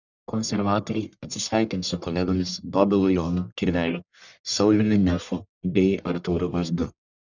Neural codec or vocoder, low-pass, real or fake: codec, 44.1 kHz, 1.7 kbps, Pupu-Codec; 7.2 kHz; fake